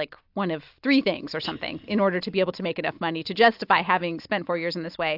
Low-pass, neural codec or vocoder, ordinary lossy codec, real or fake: 5.4 kHz; none; AAC, 48 kbps; real